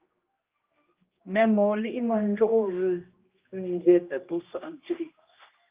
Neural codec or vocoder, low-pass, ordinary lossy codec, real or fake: codec, 16 kHz, 1 kbps, X-Codec, HuBERT features, trained on general audio; 3.6 kHz; Opus, 24 kbps; fake